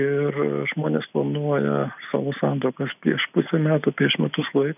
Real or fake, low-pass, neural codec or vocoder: real; 3.6 kHz; none